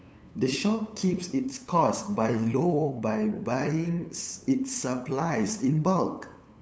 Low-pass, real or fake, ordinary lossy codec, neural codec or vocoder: none; fake; none; codec, 16 kHz, 8 kbps, FunCodec, trained on LibriTTS, 25 frames a second